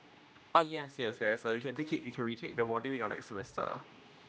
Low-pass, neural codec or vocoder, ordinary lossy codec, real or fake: none; codec, 16 kHz, 1 kbps, X-Codec, HuBERT features, trained on general audio; none; fake